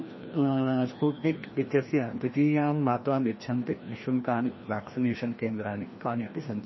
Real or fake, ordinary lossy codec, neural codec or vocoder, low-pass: fake; MP3, 24 kbps; codec, 16 kHz, 1 kbps, FreqCodec, larger model; 7.2 kHz